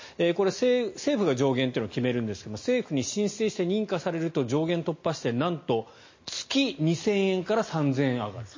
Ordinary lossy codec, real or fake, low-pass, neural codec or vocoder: MP3, 32 kbps; real; 7.2 kHz; none